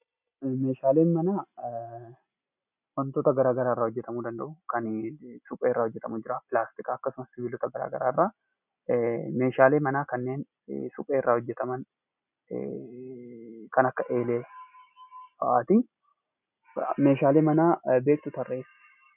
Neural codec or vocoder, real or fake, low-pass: none; real; 3.6 kHz